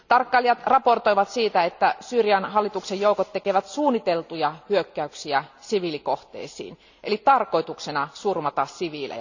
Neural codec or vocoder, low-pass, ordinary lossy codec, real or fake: none; 7.2 kHz; none; real